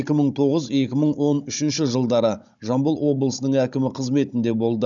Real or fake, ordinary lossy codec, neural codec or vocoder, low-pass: fake; none; codec, 16 kHz, 16 kbps, FunCodec, trained on Chinese and English, 50 frames a second; 7.2 kHz